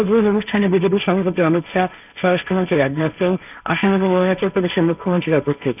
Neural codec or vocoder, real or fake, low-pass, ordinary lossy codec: codec, 16 kHz, 1.1 kbps, Voila-Tokenizer; fake; 3.6 kHz; none